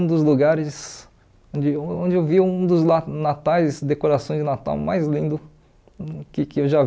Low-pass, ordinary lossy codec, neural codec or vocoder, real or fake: none; none; none; real